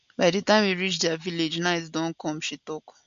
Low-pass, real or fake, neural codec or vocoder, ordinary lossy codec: 7.2 kHz; real; none; MP3, 48 kbps